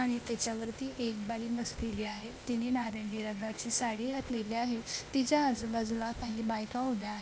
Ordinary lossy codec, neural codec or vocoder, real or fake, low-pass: none; codec, 16 kHz, 0.8 kbps, ZipCodec; fake; none